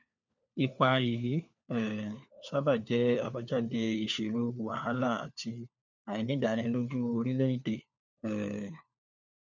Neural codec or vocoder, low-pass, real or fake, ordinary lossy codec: codec, 16 kHz, 4 kbps, FunCodec, trained on LibriTTS, 50 frames a second; 7.2 kHz; fake; none